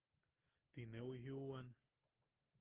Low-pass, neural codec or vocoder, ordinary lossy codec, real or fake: 3.6 kHz; none; Opus, 16 kbps; real